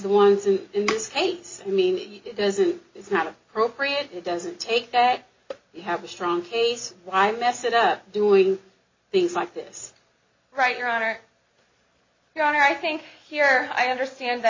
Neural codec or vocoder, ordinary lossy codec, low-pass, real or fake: none; MP3, 32 kbps; 7.2 kHz; real